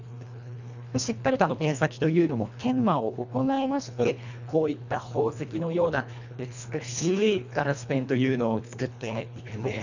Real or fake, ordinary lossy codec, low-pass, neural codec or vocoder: fake; none; 7.2 kHz; codec, 24 kHz, 1.5 kbps, HILCodec